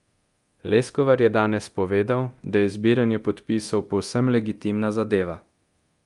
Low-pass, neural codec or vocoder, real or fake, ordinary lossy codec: 10.8 kHz; codec, 24 kHz, 0.9 kbps, DualCodec; fake; Opus, 32 kbps